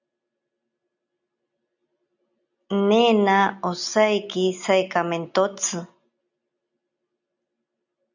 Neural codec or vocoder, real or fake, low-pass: none; real; 7.2 kHz